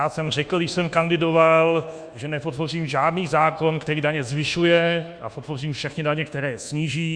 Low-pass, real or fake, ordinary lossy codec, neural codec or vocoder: 9.9 kHz; fake; Opus, 64 kbps; codec, 24 kHz, 1.2 kbps, DualCodec